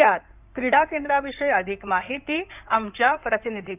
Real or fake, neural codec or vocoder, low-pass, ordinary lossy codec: fake; codec, 16 kHz in and 24 kHz out, 2.2 kbps, FireRedTTS-2 codec; 3.6 kHz; none